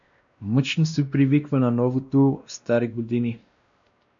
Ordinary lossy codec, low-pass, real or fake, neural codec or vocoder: MP3, 64 kbps; 7.2 kHz; fake; codec, 16 kHz, 1 kbps, X-Codec, WavLM features, trained on Multilingual LibriSpeech